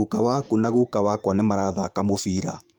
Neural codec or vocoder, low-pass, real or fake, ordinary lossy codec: codec, 44.1 kHz, 7.8 kbps, DAC; 19.8 kHz; fake; none